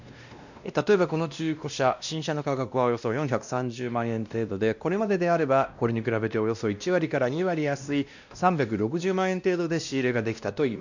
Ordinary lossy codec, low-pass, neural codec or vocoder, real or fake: none; 7.2 kHz; codec, 16 kHz, 1 kbps, X-Codec, WavLM features, trained on Multilingual LibriSpeech; fake